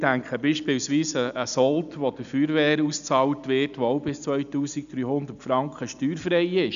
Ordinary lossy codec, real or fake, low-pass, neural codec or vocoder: none; real; 7.2 kHz; none